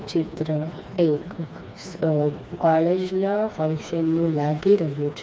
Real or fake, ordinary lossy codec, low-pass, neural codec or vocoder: fake; none; none; codec, 16 kHz, 2 kbps, FreqCodec, smaller model